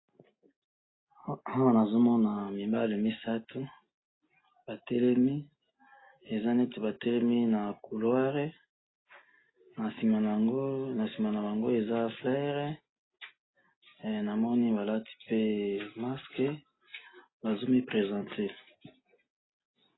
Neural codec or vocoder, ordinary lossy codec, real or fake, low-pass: none; AAC, 16 kbps; real; 7.2 kHz